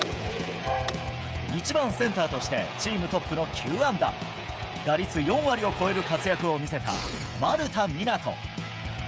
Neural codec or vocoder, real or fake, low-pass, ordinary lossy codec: codec, 16 kHz, 16 kbps, FreqCodec, smaller model; fake; none; none